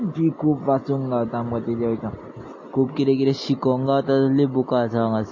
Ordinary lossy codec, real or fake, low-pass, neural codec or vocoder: MP3, 32 kbps; real; 7.2 kHz; none